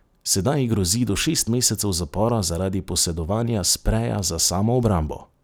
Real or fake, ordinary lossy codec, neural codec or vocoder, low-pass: real; none; none; none